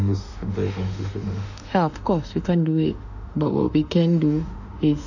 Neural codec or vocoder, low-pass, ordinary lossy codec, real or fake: autoencoder, 48 kHz, 32 numbers a frame, DAC-VAE, trained on Japanese speech; 7.2 kHz; none; fake